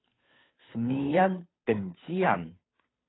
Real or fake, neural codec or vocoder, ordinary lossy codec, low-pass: fake; codec, 16 kHz, 2 kbps, FunCodec, trained on Chinese and English, 25 frames a second; AAC, 16 kbps; 7.2 kHz